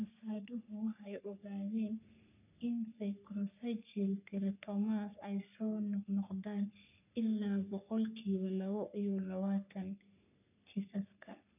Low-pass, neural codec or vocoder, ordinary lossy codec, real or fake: 3.6 kHz; codec, 44.1 kHz, 3.4 kbps, Pupu-Codec; none; fake